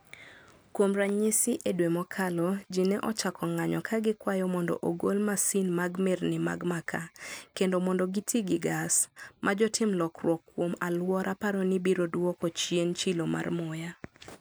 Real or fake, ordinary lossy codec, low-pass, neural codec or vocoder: real; none; none; none